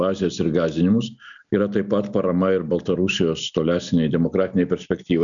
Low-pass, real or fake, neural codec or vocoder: 7.2 kHz; real; none